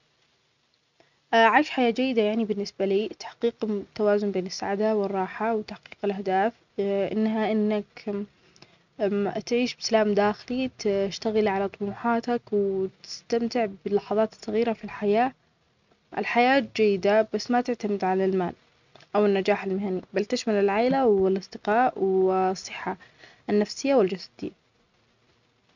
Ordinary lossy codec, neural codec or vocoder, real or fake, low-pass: none; none; real; 7.2 kHz